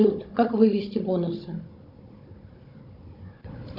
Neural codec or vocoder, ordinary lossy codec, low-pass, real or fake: codec, 16 kHz, 16 kbps, FunCodec, trained on Chinese and English, 50 frames a second; MP3, 48 kbps; 5.4 kHz; fake